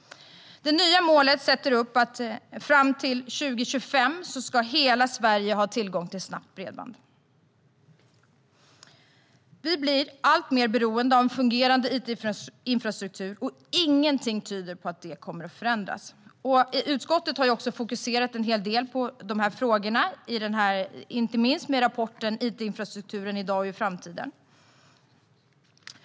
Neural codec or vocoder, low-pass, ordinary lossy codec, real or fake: none; none; none; real